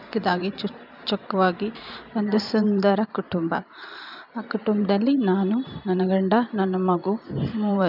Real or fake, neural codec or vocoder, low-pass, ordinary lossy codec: fake; vocoder, 44.1 kHz, 128 mel bands every 512 samples, BigVGAN v2; 5.4 kHz; none